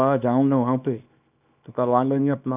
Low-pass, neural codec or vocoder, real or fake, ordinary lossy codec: 3.6 kHz; codec, 24 kHz, 0.9 kbps, WavTokenizer, small release; fake; none